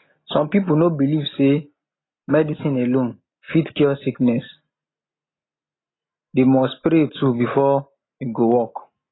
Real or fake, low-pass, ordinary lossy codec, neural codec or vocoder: real; 7.2 kHz; AAC, 16 kbps; none